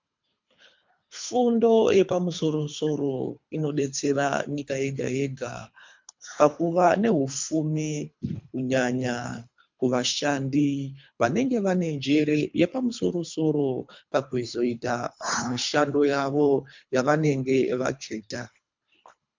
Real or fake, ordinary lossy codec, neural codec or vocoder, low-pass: fake; MP3, 64 kbps; codec, 24 kHz, 3 kbps, HILCodec; 7.2 kHz